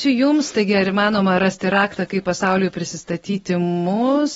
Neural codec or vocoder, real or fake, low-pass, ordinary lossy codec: none; real; 19.8 kHz; AAC, 24 kbps